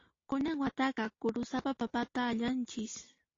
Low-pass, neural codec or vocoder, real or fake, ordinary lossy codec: 7.2 kHz; none; real; AAC, 32 kbps